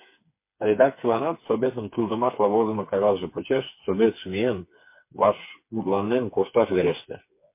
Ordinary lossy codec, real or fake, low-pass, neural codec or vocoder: MP3, 24 kbps; fake; 3.6 kHz; codec, 16 kHz, 4 kbps, FreqCodec, smaller model